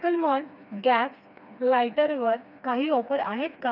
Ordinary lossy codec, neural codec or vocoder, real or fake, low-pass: none; codec, 16 kHz, 2 kbps, FreqCodec, smaller model; fake; 5.4 kHz